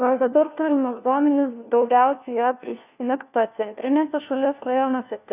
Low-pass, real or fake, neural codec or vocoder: 3.6 kHz; fake; codec, 16 kHz, 1 kbps, FunCodec, trained on LibriTTS, 50 frames a second